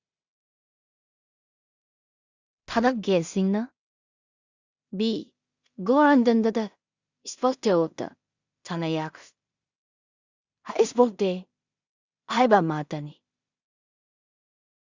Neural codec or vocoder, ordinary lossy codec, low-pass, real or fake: codec, 16 kHz in and 24 kHz out, 0.4 kbps, LongCat-Audio-Codec, two codebook decoder; Opus, 64 kbps; 7.2 kHz; fake